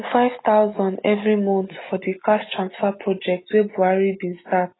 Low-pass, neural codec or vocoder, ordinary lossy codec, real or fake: 7.2 kHz; none; AAC, 16 kbps; real